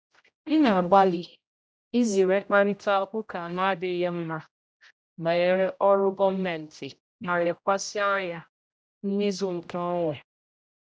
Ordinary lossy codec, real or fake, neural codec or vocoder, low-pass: none; fake; codec, 16 kHz, 0.5 kbps, X-Codec, HuBERT features, trained on general audio; none